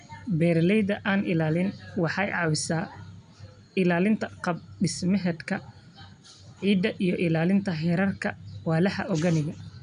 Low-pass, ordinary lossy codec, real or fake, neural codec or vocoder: 9.9 kHz; none; real; none